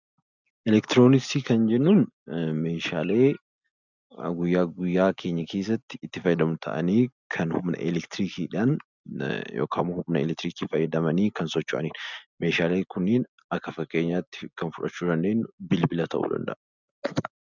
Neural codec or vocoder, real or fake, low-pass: none; real; 7.2 kHz